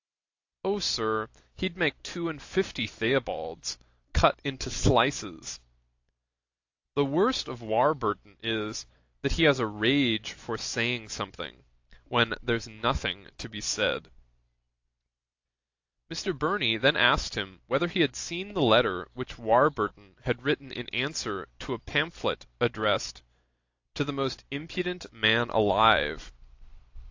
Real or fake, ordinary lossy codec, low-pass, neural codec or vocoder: real; AAC, 48 kbps; 7.2 kHz; none